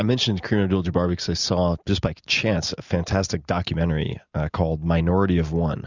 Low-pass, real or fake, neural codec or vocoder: 7.2 kHz; real; none